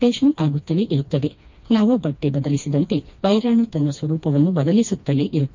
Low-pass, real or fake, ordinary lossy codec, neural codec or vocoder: 7.2 kHz; fake; MP3, 48 kbps; codec, 16 kHz, 2 kbps, FreqCodec, smaller model